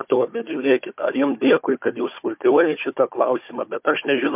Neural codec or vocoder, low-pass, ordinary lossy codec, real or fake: codec, 16 kHz, 4 kbps, FunCodec, trained on Chinese and English, 50 frames a second; 3.6 kHz; MP3, 32 kbps; fake